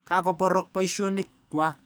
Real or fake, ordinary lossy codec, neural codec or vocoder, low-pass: fake; none; codec, 44.1 kHz, 2.6 kbps, SNAC; none